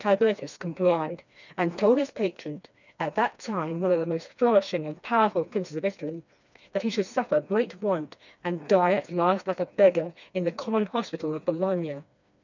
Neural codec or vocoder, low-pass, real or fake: codec, 16 kHz, 2 kbps, FreqCodec, smaller model; 7.2 kHz; fake